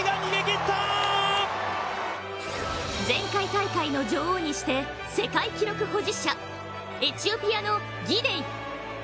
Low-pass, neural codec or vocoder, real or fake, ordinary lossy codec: none; none; real; none